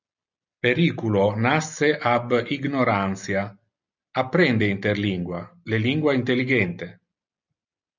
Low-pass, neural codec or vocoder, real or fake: 7.2 kHz; none; real